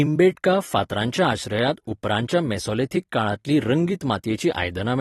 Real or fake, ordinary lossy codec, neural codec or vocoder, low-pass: fake; AAC, 32 kbps; vocoder, 44.1 kHz, 128 mel bands every 256 samples, BigVGAN v2; 19.8 kHz